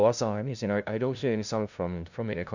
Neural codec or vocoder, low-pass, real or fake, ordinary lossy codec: codec, 16 kHz, 0.5 kbps, FunCodec, trained on LibriTTS, 25 frames a second; 7.2 kHz; fake; none